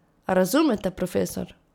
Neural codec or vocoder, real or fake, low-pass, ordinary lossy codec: none; real; 19.8 kHz; none